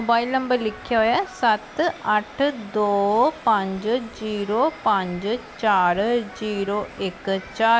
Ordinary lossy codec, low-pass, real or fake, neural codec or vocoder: none; none; real; none